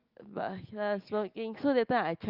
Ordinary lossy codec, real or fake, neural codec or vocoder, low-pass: Opus, 32 kbps; real; none; 5.4 kHz